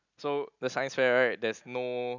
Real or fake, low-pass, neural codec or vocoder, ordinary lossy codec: real; 7.2 kHz; none; none